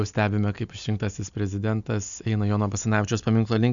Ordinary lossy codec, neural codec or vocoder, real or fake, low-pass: MP3, 96 kbps; none; real; 7.2 kHz